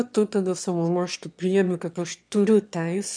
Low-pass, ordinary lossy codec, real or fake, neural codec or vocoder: 9.9 kHz; MP3, 96 kbps; fake; autoencoder, 22.05 kHz, a latent of 192 numbers a frame, VITS, trained on one speaker